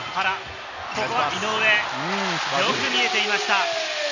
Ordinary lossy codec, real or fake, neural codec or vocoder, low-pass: Opus, 64 kbps; real; none; 7.2 kHz